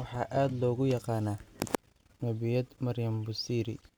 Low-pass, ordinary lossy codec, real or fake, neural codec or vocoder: none; none; real; none